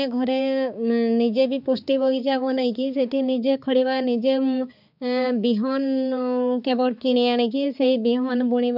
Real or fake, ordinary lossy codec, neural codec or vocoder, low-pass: fake; none; codec, 16 kHz, 4 kbps, X-Codec, HuBERT features, trained on balanced general audio; 5.4 kHz